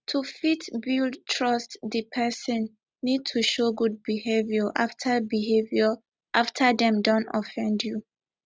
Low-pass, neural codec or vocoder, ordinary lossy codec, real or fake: none; none; none; real